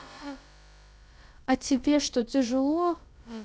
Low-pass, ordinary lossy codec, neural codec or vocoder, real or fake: none; none; codec, 16 kHz, about 1 kbps, DyCAST, with the encoder's durations; fake